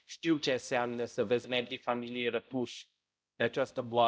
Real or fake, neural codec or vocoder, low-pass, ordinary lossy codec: fake; codec, 16 kHz, 0.5 kbps, X-Codec, HuBERT features, trained on balanced general audio; none; none